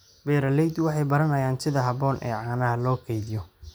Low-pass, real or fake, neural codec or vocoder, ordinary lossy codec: none; real; none; none